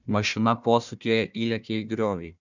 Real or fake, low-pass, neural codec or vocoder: fake; 7.2 kHz; codec, 16 kHz, 1 kbps, FunCodec, trained on Chinese and English, 50 frames a second